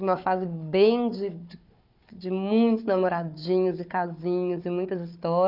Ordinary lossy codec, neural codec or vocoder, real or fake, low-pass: none; codec, 16 kHz, 4 kbps, FunCodec, trained on Chinese and English, 50 frames a second; fake; 5.4 kHz